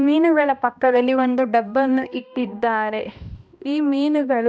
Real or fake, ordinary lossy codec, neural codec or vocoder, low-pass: fake; none; codec, 16 kHz, 1 kbps, X-Codec, HuBERT features, trained on balanced general audio; none